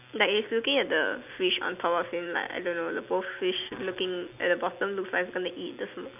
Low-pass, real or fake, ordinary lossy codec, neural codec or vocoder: 3.6 kHz; real; none; none